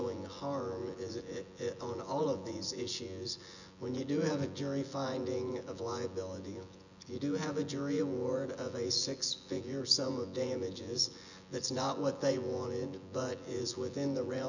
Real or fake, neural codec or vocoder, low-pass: fake; vocoder, 24 kHz, 100 mel bands, Vocos; 7.2 kHz